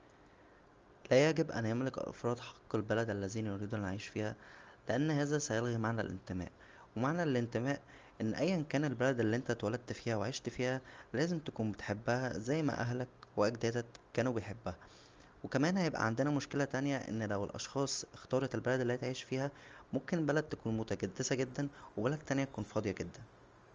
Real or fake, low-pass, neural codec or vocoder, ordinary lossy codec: real; 7.2 kHz; none; Opus, 32 kbps